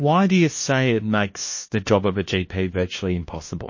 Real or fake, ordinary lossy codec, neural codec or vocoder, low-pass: fake; MP3, 32 kbps; codec, 16 kHz, 1 kbps, FunCodec, trained on LibriTTS, 50 frames a second; 7.2 kHz